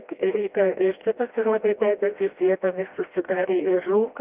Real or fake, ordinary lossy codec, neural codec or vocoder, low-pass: fake; Opus, 24 kbps; codec, 16 kHz, 1 kbps, FreqCodec, smaller model; 3.6 kHz